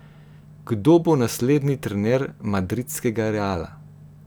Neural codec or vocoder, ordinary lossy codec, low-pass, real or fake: none; none; none; real